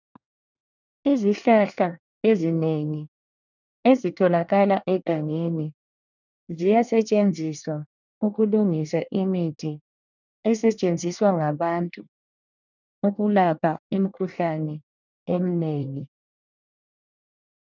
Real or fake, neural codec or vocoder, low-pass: fake; codec, 24 kHz, 1 kbps, SNAC; 7.2 kHz